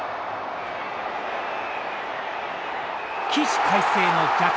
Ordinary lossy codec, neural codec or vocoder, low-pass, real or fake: none; none; none; real